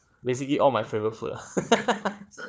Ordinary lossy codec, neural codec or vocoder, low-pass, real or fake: none; codec, 16 kHz, 4 kbps, FunCodec, trained on Chinese and English, 50 frames a second; none; fake